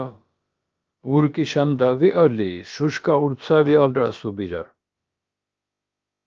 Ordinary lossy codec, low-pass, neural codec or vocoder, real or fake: Opus, 24 kbps; 7.2 kHz; codec, 16 kHz, about 1 kbps, DyCAST, with the encoder's durations; fake